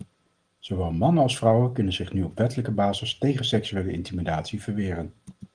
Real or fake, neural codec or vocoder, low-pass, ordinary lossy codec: real; none; 9.9 kHz; Opus, 24 kbps